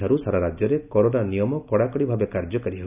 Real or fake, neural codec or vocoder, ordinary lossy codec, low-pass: real; none; none; 3.6 kHz